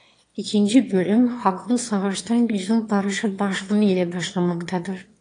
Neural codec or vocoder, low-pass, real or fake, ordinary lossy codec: autoencoder, 22.05 kHz, a latent of 192 numbers a frame, VITS, trained on one speaker; 9.9 kHz; fake; AAC, 48 kbps